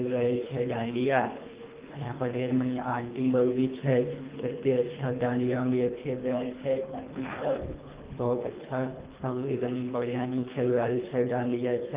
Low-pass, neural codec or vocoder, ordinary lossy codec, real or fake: 3.6 kHz; codec, 24 kHz, 3 kbps, HILCodec; Opus, 32 kbps; fake